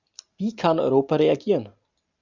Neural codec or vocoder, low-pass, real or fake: none; 7.2 kHz; real